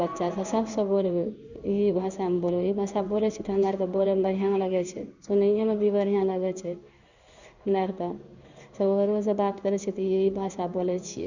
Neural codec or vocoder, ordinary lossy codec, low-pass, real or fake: codec, 16 kHz in and 24 kHz out, 1 kbps, XY-Tokenizer; none; 7.2 kHz; fake